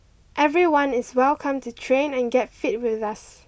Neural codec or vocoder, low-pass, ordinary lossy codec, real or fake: none; none; none; real